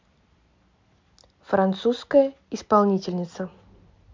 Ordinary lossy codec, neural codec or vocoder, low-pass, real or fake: MP3, 64 kbps; none; 7.2 kHz; real